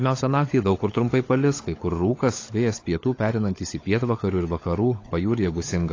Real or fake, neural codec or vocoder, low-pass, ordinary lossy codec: fake; codec, 16 kHz, 16 kbps, FunCodec, trained on LibriTTS, 50 frames a second; 7.2 kHz; AAC, 32 kbps